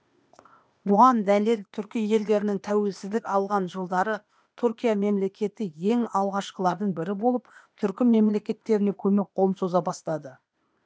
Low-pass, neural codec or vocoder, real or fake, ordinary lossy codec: none; codec, 16 kHz, 0.8 kbps, ZipCodec; fake; none